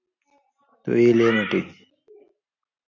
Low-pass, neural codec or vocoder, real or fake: 7.2 kHz; none; real